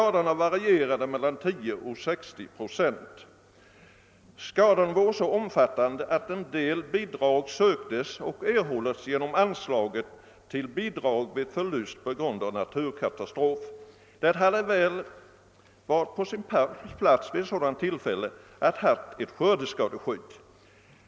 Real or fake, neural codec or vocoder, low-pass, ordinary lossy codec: real; none; none; none